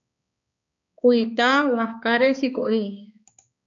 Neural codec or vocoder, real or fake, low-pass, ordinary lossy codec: codec, 16 kHz, 2 kbps, X-Codec, HuBERT features, trained on balanced general audio; fake; 7.2 kHz; AAC, 64 kbps